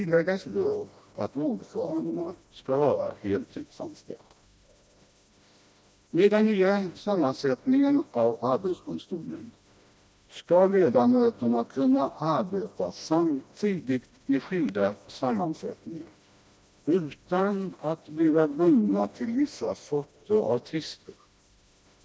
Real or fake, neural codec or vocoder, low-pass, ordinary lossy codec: fake; codec, 16 kHz, 1 kbps, FreqCodec, smaller model; none; none